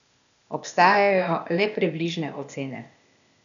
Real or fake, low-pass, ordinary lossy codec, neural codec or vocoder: fake; 7.2 kHz; none; codec, 16 kHz, 0.8 kbps, ZipCodec